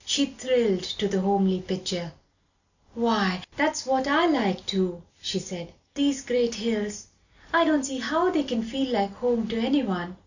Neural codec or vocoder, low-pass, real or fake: none; 7.2 kHz; real